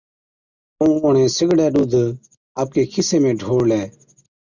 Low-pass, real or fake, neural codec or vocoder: 7.2 kHz; real; none